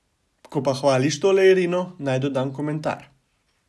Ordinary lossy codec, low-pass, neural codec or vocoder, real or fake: none; none; none; real